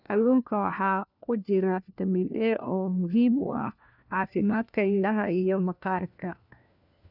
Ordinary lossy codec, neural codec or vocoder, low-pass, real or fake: none; codec, 16 kHz, 1 kbps, FunCodec, trained on LibriTTS, 50 frames a second; 5.4 kHz; fake